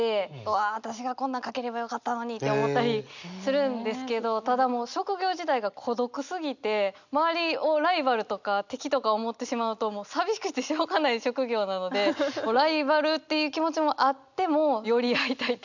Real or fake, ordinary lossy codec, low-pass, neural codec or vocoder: real; none; 7.2 kHz; none